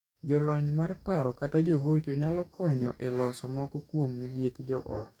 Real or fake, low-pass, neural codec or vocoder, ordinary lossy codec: fake; 19.8 kHz; codec, 44.1 kHz, 2.6 kbps, DAC; none